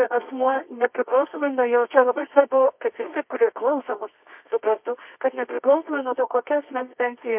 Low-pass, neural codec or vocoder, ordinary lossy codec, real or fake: 3.6 kHz; codec, 24 kHz, 0.9 kbps, WavTokenizer, medium music audio release; MP3, 32 kbps; fake